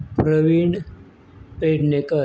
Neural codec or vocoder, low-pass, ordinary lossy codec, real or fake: none; none; none; real